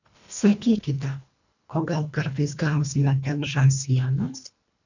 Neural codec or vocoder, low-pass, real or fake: codec, 24 kHz, 1.5 kbps, HILCodec; 7.2 kHz; fake